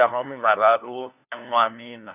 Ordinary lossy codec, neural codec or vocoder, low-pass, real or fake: none; codec, 16 kHz, 1 kbps, FunCodec, trained on LibriTTS, 50 frames a second; 3.6 kHz; fake